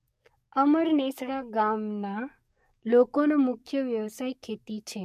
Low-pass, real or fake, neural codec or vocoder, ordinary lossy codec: 14.4 kHz; fake; codec, 44.1 kHz, 7.8 kbps, DAC; MP3, 64 kbps